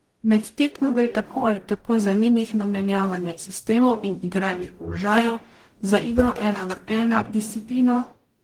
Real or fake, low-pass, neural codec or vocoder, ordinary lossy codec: fake; 19.8 kHz; codec, 44.1 kHz, 0.9 kbps, DAC; Opus, 24 kbps